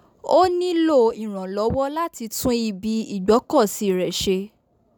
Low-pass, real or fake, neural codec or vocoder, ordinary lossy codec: none; real; none; none